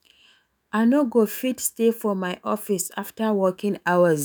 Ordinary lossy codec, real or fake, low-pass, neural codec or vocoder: none; fake; none; autoencoder, 48 kHz, 128 numbers a frame, DAC-VAE, trained on Japanese speech